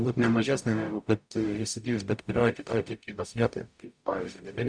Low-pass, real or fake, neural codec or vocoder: 9.9 kHz; fake; codec, 44.1 kHz, 0.9 kbps, DAC